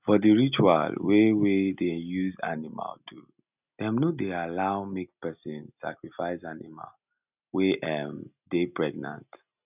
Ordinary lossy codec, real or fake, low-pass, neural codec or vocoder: none; real; 3.6 kHz; none